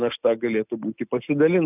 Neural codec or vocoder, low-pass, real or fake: none; 3.6 kHz; real